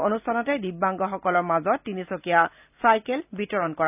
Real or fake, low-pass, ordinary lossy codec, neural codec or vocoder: real; 3.6 kHz; none; none